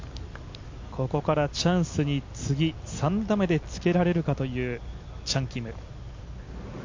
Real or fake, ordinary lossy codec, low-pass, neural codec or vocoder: real; MP3, 64 kbps; 7.2 kHz; none